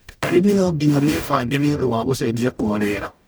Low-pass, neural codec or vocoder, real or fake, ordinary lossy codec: none; codec, 44.1 kHz, 0.9 kbps, DAC; fake; none